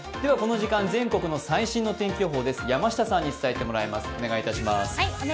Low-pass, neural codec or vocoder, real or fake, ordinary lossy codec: none; none; real; none